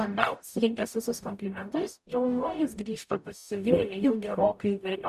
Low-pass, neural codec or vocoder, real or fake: 14.4 kHz; codec, 44.1 kHz, 0.9 kbps, DAC; fake